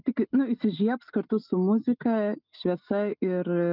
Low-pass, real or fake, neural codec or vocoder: 5.4 kHz; real; none